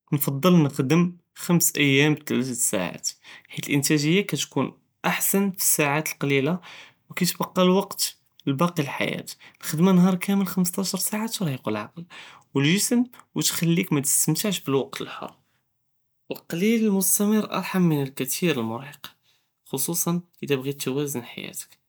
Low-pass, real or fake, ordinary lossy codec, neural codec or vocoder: none; real; none; none